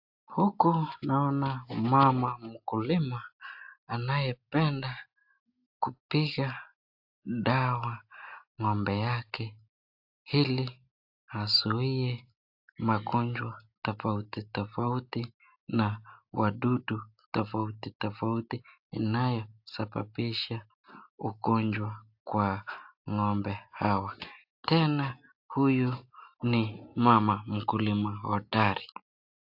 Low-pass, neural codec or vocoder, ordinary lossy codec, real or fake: 5.4 kHz; none; AAC, 48 kbps; real